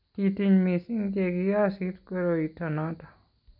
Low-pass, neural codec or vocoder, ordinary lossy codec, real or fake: 5.4 kHz; none; none; real